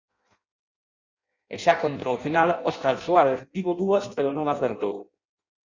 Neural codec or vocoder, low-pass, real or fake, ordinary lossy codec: codec, 16 kHz in and 24 kHz out, 0.6 kbps, FireRedTTS-2 codec; 7.2 kHz; fake; Opus, 64 kbps